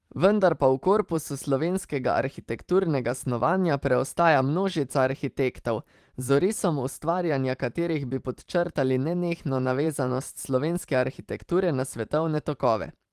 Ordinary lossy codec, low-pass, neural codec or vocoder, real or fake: Opus, 24 kbps; 14.4 kHz; vocoder, 44.1 kHz, 128 mel bands every 512 samples, BigVGAN v2; fake